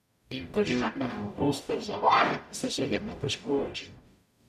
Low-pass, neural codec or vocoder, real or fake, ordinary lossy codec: 14.4 kHz; codec, 44.1 kHz, 0.9 kbps, DAC; fake; none